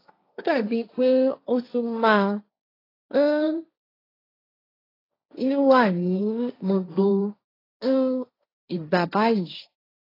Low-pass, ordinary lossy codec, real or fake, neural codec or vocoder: 5.4 kHz; AAC, 24 kbps; fake; codec, 16 kHz, 1.1 kbps, Voila-Tokenizer